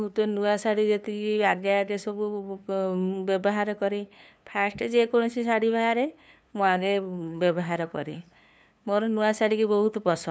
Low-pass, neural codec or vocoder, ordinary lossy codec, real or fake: none; codec, 16 kHz, 2 kbps, FunCodec, trained on LibriTTS, 25 frames a second; none; fake